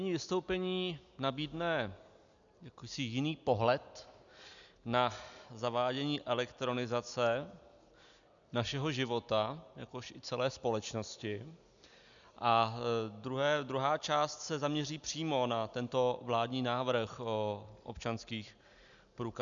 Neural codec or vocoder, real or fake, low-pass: none; real; 7.2 kHz